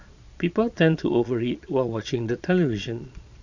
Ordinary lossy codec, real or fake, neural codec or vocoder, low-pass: none; fake; vocoder, 22.05 kHz, 80 mel bands, Vocos; 7.2 kHz